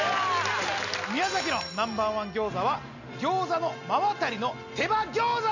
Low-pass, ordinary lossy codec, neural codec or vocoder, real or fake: 7.2 kHz; none; none; real